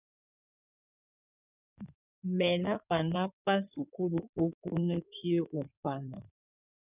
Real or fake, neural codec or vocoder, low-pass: fake; codec, 16 kHz in and 24 kHz out, 2.2 kbps, FireRedTTS-2 codec; 3.6 kHz